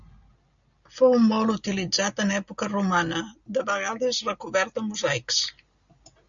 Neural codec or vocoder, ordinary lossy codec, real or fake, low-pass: none; MP3, 64 kbps; real; 7.2 kHz